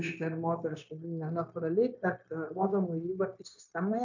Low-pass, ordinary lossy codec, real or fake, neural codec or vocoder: 7.2 kHz; AAC, 48 kbps; fake; codec, 16 kHz, 0.9 kbps, LongCat-Audio-Codec